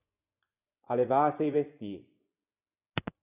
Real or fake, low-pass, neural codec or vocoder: fake; 3.6 kHz; vocoder, 24 kHz, 100 mel bands, Vocos